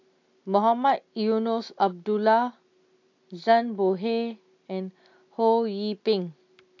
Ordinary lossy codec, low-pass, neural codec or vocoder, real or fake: AAC, 48 kbps; 7.2 kHz; none; real